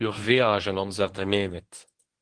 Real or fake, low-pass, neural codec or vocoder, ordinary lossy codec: fake; 9.9 kHz; codec, 24 kHz, 0.9 kbps, WavTokenizer, medium speech release version 1; Opus, 16 kbps